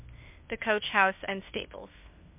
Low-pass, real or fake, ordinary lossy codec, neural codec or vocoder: 3.6 kHz; fake; MP3, 32 kbps; codec, 16 kHz, 0.8 kbps, ZipCodec